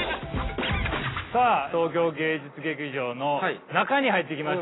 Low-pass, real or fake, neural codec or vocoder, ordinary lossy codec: 7.2 kHz; real; none; AAC, 16 kbps